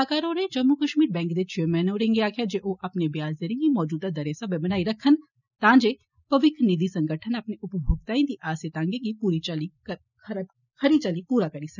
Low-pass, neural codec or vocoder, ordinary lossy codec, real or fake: 7.2 kHz; none; none; real